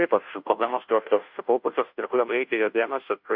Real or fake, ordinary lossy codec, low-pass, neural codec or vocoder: fake; MP3, 48 kbps; 5.4 kHz; codec, 16 kHz, 0.5 kbps, FunCodec, trained on Chinese and English, 25 frames a second